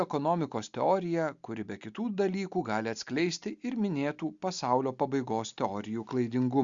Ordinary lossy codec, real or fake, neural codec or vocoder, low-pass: Opus, 64 kbps; real; none; 7.2 kHz